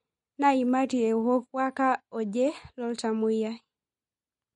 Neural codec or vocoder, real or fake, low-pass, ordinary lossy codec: none; real; 14.4 kHz; MP3, 48 kbps